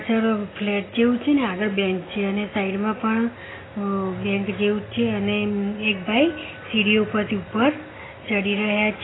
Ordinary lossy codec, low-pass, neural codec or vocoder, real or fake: AAC, 16 kbps; 7.2 kHz; none; real